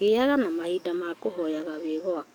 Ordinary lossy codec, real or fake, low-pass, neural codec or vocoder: none; fake; none; codec, 44.1 kHz, 7.8 kbps, DAC